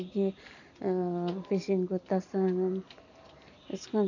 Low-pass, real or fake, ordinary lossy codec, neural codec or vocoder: 7.2 kHz; real; none; none